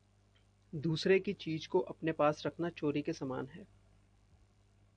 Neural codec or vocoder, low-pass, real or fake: none; 9.9 kHz; real